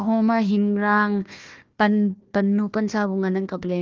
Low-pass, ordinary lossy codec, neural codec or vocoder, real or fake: 7.2 kHz; Opus, 32 kbps; codec, 16 kHz, 1 kbps, FunCodec, trained on Chinese and English, 50 frames a second; fake